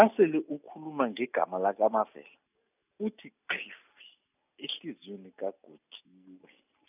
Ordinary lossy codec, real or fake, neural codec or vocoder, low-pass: none; real; none; 3.6 kHz